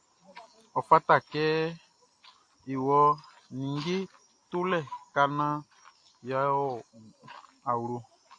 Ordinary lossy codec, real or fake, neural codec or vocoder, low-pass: AAC, 48 kbps; real; none; 9.9 kHz